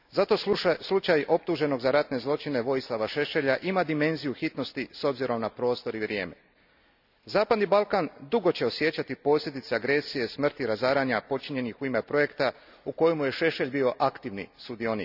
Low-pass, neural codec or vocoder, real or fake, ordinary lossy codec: 5.4 kHz; none; real; none